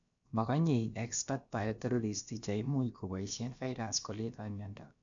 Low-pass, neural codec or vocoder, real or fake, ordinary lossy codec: 7.2 kHz; codec, 16 kHz, about 1 kbps, DyCAST, with the encoder's durations; fake; MP3, 64 kbps